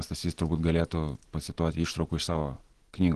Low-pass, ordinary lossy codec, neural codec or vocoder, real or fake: 10.8 kHz; Opus, 16 kbps; none; real